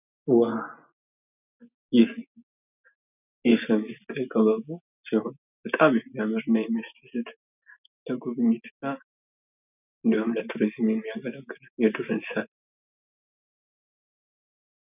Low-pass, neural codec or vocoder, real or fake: 3.6 kHz; none; real